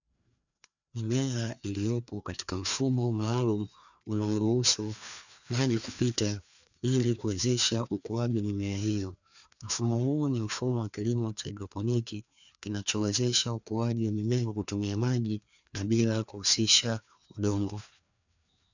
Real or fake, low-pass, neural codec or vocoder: fake; 7.2 kHz; codec, 16 kHz, 2 kbps, FreqCodec, larger model